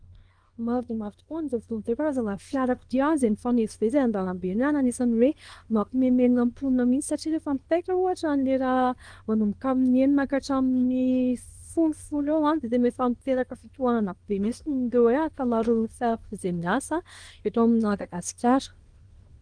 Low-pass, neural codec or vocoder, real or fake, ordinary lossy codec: 9.9 kHz; codec, 24 kHz, 0.9 kbps, WavTokenizer, small release; fake; Opus, 24 kbps